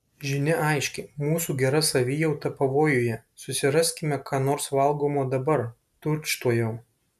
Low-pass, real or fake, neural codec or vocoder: 14.4 kHz; real; none